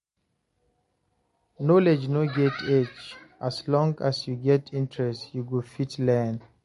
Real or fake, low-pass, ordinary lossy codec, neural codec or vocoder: real; 14.4 kHz; MP3, 48 kbps; none